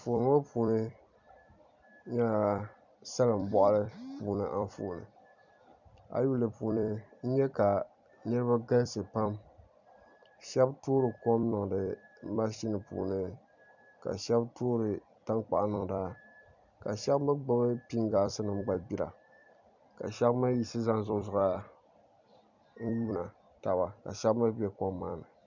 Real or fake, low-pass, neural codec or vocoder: fake; 7.2 kHz; vocoder, 44.1 kHz, 128 mel bands every 256 samples, BigVGAN v2